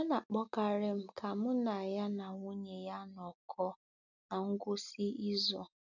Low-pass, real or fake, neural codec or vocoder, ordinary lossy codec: 7.2 kHz; real; none; none